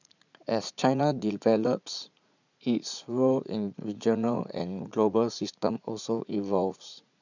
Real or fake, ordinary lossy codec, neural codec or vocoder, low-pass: fake; none; vocoder, 44.1 kHz, 80 mel bands, Vocos; 7.2 kHz